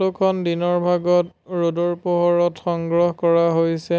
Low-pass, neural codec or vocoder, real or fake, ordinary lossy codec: none; none; real; none